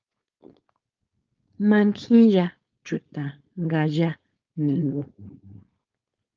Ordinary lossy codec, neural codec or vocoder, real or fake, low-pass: Opus, 24 kbps; codec, 16 kHz, 4.8 kbps, FACodec; fake; 7.2 kHz